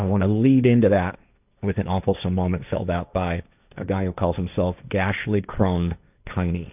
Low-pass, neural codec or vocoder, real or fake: 3.6 kHz; codec, 16 kHz, 1.1 kbps, Voila-Tokenizer; fake